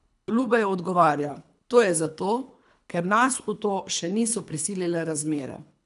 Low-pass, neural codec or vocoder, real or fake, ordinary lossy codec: 10.8 kHz; codec, 24 kHz, 3 kbps, HILCodec; fake; none